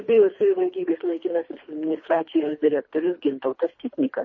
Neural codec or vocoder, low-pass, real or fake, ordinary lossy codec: codec, 24 kHz, 3 kbps, HILCodec; 7.2 kHz; fake; MP3, 32 kbps